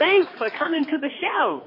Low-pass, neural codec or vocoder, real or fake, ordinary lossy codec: 5.4 kHz; codec, 16 kHz, 2 kbps, X-Codec, HuBERT features, trained on balanced general audio; fake; MP3, 24 kbps